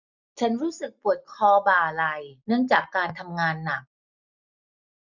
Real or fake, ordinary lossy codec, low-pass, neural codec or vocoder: real; none; 7.2 kHz; none